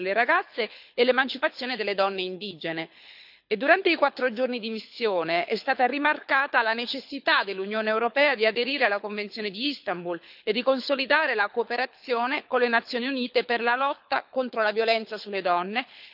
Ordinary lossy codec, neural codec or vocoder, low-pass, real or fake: none; codec, 24 kHz, 6 kbps, HILCodec; 5.4 kHz; fake